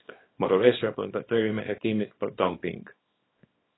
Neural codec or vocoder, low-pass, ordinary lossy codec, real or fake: codec, 24 kHz, 0.9 kbps, WavTokenizer, small release; 7.2 kHz; AAC, 16 kbps; fake